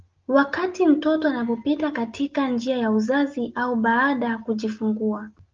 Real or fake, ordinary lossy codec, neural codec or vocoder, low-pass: real; Opus, 24 kbps; none; 7.2 kHz